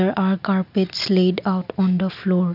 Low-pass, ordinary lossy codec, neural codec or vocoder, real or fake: 5.4 kHz; none; none; real